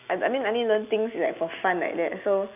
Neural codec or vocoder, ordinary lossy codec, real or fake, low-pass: none; none; real; 3.6 kHz